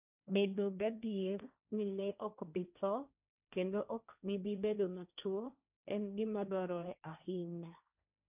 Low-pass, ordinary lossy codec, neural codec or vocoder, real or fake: 3.6 kHz; none; codec, 16 kHz, 1.1 kbps, Voila-Tokenizer; fake